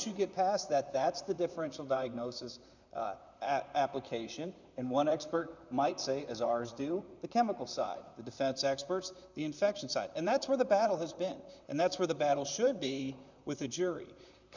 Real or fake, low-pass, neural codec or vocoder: fake; 7.2 kHz; vocoder, 44.1 kHz, 128 mel bands, Pupu-Vocoder